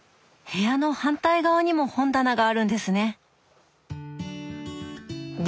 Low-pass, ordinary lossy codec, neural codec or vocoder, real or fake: none; none; none; real